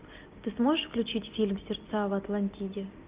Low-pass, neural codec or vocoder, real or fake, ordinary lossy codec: 3.6 kHz; none; real; Opus, 24 kbps